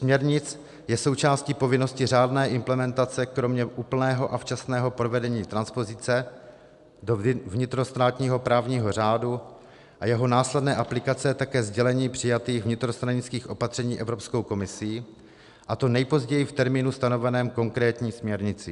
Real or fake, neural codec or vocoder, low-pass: real; none; 10.8 kHz